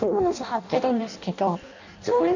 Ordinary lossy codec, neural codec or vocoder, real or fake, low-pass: none; codec, 16 kHz in and 24 kHz out, 0.6 kbps, FireRedTTS-2 codec; fake; 7.2 kHz